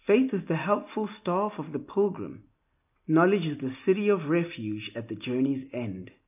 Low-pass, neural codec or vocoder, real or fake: 3.6 kHz; none; real